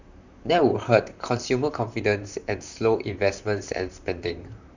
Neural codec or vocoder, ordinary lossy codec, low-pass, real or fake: vocoder, 44.1 kHz, 128 mel bands, Pupu-Vocoder; none; 7.2 kHz; fake